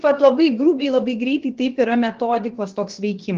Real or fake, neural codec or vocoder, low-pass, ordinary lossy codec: fake; codec, 16 kHz, about 1 kbps, DyCAST, with the encoder's durations; 7.2 kHz; Opus, 16 kbps